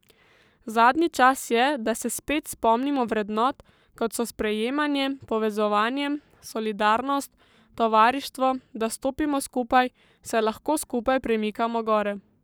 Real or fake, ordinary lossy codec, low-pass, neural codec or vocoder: fake; none; none; codec, 44.1 kHz, 7.8 kbps, Pupu-Codec